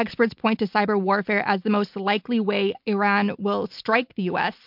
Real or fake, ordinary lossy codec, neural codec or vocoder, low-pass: real; MP3, 48 kbps; none; 5.4 kHz